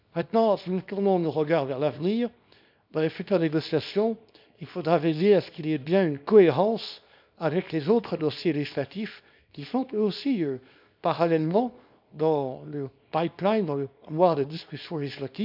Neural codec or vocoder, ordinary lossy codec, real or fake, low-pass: codec, 24 kHz, 0.9 kbps, WavTokenizer, small release; none; fake; 5.4 kHz